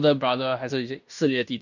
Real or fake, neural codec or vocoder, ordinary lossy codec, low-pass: fake; codec, 16 kHz in and 24 kHz out, 0.9 kbps, LongCat-Audio-Codec, fine tuned four codebook decoder; none; 7.2 kHz